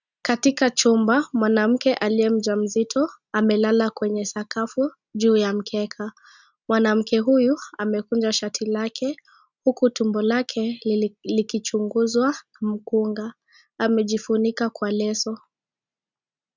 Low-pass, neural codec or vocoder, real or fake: 7.2 kHz; none; real